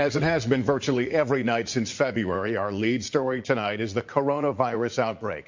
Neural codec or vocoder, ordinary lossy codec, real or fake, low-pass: vocoder, 44.1 kHz, 80 mel bands, Vocos; MP3, 64 kbps; fake; 7.2 kHz